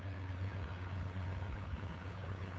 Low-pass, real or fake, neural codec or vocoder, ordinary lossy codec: none; fake; codec, 16 kHz, 2 kbps, FunCodec, trained on LibriTTS, 25 frames a second; none